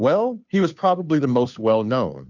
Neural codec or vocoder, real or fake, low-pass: codec, 16 kHz, 2 kbps, FunCodec, trained on Chinese and English, 25 frames a second; fake; 7.2 kHz